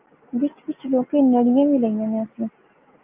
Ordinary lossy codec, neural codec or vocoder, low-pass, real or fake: Opus, 32 kbps; none; 3.6 kHz; real